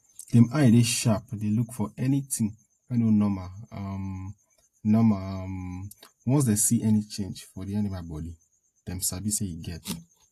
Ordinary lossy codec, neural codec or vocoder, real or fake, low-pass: AAC, 48 kbps; none; real; 14.4 kHz